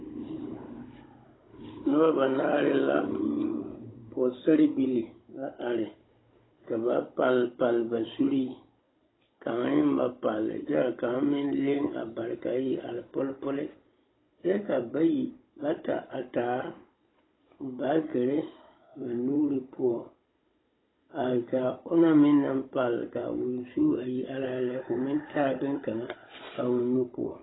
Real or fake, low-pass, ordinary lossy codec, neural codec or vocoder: fake; 7.2 kHz; AAC, 16 kbps; codec, 16 kHz, 16 kbps, FunCodec, trained on Chinese and English, 50 frames a second